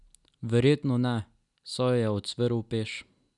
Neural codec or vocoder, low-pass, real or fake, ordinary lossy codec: none; 10.8 kHz; real; none